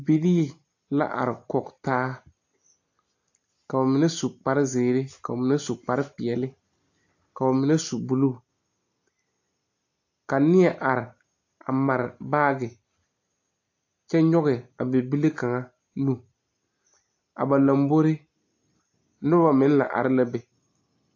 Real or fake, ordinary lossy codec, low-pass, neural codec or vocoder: real; AAC, 48 kbps; 7.2 kHz; none